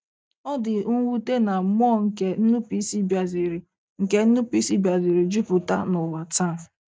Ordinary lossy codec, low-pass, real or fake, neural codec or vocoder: none; none; real; none